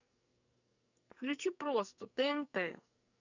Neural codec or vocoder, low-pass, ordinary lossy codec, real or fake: codec, 44.1 kHz, 2.6 kbps, SNAC; 7.2 kHz; none; fake